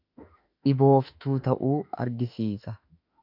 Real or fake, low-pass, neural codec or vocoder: fake; 5.4 kHz; autoencoder, 48 kHz, 32 numbers a frame, DAC-VAE, trained on Japanese speech